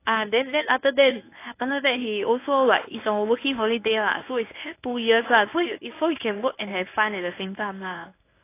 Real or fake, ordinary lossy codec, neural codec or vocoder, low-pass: fake; AAC, 24 kbps; codec, 24 kHz, 0.9 kbps, WavTokenizer, medium speech release version 1; 3.6 kHz